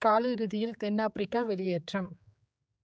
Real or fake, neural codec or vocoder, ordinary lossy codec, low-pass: fake; codec, 16 kHz, 4 kbps, X-Codec, HuBERT features, trained on general audio; none; none